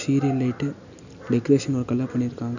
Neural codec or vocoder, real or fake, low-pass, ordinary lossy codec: none; real; 7.2 kHz; none